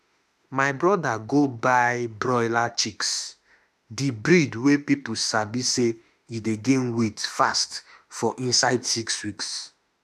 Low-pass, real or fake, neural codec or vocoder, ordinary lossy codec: 14.4 kHz; fake; autoencoder, 48 kHz, 32 numbers a frame, DAC-VAE, trained on Japanese speech; none